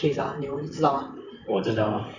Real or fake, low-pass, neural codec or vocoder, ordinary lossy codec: fake; 7.2 kHz; vocoder, 44.1 kHz, 128 mel bands, Pupu-Vocoder; none